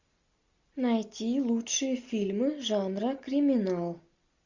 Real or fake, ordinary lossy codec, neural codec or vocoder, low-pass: real; Opus, 64 kbps; none; 7.2 kHz